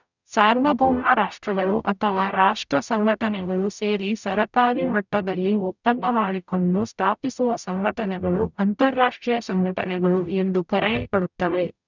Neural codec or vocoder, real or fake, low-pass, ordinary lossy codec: codec, 44.1 kHz, 0.9 kbps, DAC; fake; 7.2 kHz; none